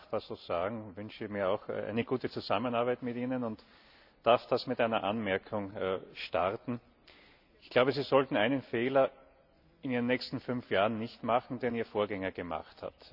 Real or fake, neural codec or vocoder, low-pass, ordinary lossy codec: real; none; 5.4 kHz; none